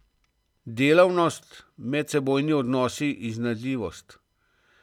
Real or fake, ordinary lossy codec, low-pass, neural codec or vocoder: real; none; 19.8 kHz; none